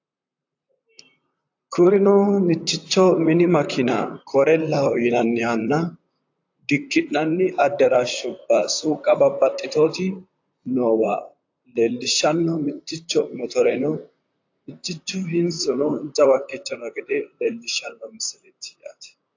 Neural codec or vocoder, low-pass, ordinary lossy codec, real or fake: vocoder, 44.1 kHz, 128 mel bands, Pupu-Vocoder; 7.2 kHz; AAC, 48 kbps; fake